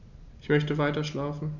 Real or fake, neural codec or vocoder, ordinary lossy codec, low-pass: real; none; none; 7.2 kHz